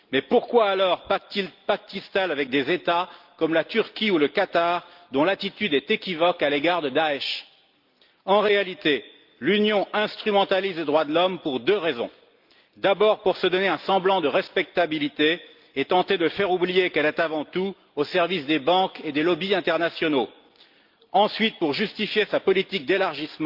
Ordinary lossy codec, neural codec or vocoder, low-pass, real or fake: Opus, 24 kbps; none; 5.4 kHz; real